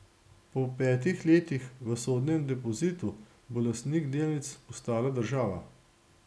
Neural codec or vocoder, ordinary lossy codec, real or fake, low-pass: none; none; real; none